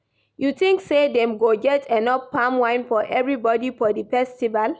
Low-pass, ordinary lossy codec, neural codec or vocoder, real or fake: none; none; none; real